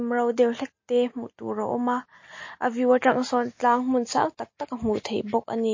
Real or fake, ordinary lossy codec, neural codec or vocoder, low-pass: real; MP3, 32 kbps; none; 7.2 kHz